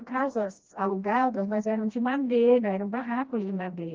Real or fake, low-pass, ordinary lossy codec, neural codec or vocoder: fake; 7.2 kHz; Opus, 32 kbps; codec, 16 kHz, 1 kbps, FreqCodec, smaller model